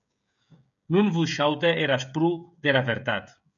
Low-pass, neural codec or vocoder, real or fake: 7.2 kHz; codec, 16 kHz, 16 kbps, FreqCodec, smaller model; fake